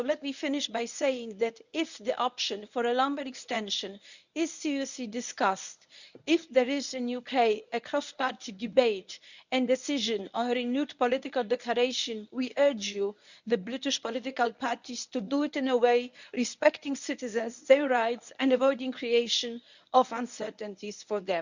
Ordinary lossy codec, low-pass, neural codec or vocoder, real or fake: none; 7.2 kHz; codec, 24 kHz, 0.9 kbps, WavTokenizer, medium speech release version 1; fake